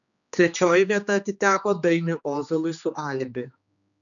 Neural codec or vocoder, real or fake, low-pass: codec, 16 kHz, 2 kbps, X-Codec, HuBERT features, trained on general audio; fake; 7.2 kHz